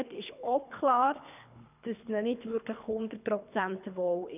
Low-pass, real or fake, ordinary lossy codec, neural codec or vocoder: 3.6 kHz; fake; none; codec, 24 kHz, 3 kbps, HILCodec